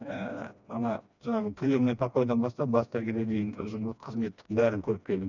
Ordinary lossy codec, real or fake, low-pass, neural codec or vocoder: none; fake; 7.2 kHz; codec, 16 kHz, 1 kbps, FreqCodec, smaller model